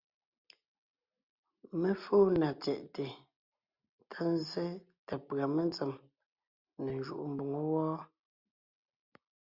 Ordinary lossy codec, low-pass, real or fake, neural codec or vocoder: Opus, 64 kbps; 5.4 kHz; real; none